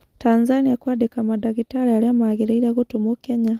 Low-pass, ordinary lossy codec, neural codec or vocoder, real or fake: 14.4 kHz; Opus, 24 kbps; none; real